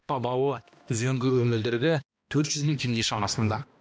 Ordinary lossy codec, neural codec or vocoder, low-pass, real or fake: none; codec, 16 kHz, 1 kbps, X-Codec, HuBERT features, trained on balanced general audio; none; fake